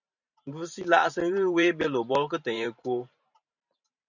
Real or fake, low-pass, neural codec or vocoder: fake; 7.2 kHz; vocoder, 44.1 kHz, 128 mel bands every 512 samples, BigVGAN v2